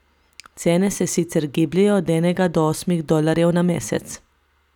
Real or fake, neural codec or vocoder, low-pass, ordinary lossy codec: real; none; 19.8 kHz; none